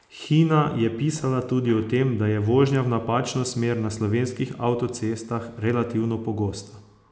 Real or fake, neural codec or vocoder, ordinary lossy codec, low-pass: real; none; none; none